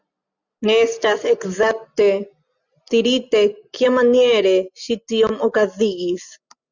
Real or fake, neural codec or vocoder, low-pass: real; none; 7.2 kHz